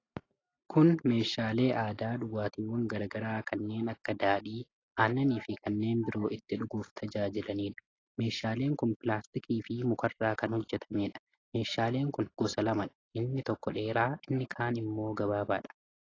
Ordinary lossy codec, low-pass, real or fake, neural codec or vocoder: AAC, 32 kbps; 7.2 kHz; real; none